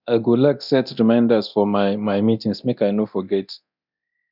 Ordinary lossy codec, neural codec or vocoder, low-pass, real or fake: none; codec, 24 kHz, 0.9 kbps, DualCodec; 5.4 kHz; fake